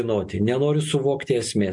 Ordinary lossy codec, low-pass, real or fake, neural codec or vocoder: MP3, 64 kbps; 10.8 kHz; real; none